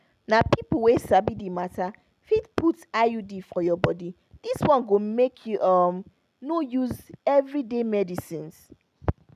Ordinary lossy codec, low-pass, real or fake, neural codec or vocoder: none; 14.4 kHz; real; none